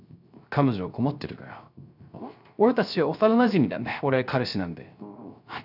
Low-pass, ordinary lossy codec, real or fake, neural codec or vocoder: 5.4 kHz; none; fake; codec, 16 kHz, 0.3 kbps, FocalCodec